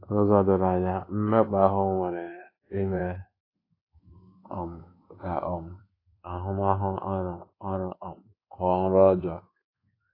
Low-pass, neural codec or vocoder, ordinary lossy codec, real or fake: 5.4 kHz; codec, 24 kHz, 1.2 kbps, DualCodec; AAC, 24 kbps; fake